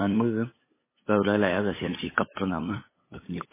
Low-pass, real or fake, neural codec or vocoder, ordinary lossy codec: 3.6 kHz; fake; codec, 16 kHz, 8 kbps, FunCodec, trained on LibriTTS, 25 frames a second; MP3, 16 kbps